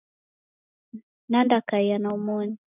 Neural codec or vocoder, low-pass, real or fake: none; 3.6 kHz; real